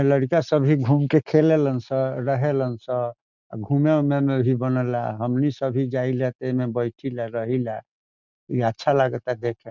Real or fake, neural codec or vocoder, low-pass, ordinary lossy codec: real; none; 7.2 kHz; none